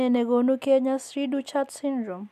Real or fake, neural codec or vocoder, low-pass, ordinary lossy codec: real; none; 14.4 kHz; none